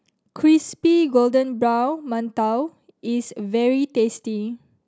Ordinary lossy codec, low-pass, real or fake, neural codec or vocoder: none; none; real; none